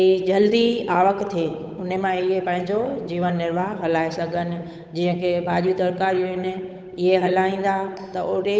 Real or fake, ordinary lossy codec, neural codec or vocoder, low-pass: fake; none; codec, 16 kHz, 8 kbps, FunCodec, trained on Chinese and English, 25 frames a second; none